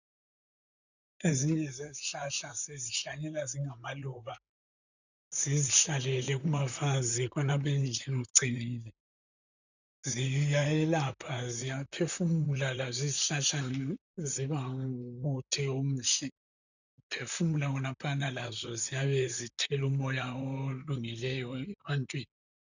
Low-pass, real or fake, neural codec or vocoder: 7.2 kHz; fake; vocoder, 44.1 kHz, 128 mel bands, Pupu-Vocoder